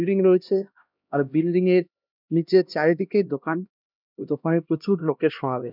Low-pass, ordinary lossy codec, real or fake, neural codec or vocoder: 5.4 kHz; none; fake; codec, 16 kHz, 1 kbps, X-Codec, HuBERT features, trained on LibriSpeech